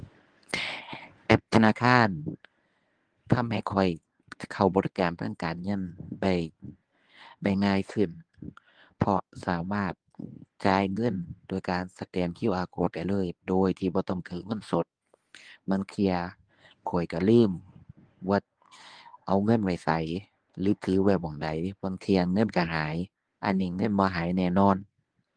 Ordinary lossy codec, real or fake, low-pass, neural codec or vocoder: Opus, 24 kbps; fake; 9.9 kHz; codec, 24 kHz, 0.9 kbps, WavTokenizer, medium speech release version 1